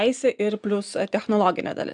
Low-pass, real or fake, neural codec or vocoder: 9.9 kHz; real; none